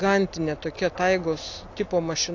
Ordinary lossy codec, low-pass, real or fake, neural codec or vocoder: AAC, 48 kbps; 7.2 kHz; real; none